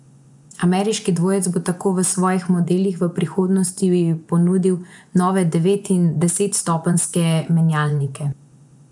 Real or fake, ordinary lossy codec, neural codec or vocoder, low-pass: fake; none; vocoder, 24 kHz, 100 mel bands, Vocos; 10.8 kHz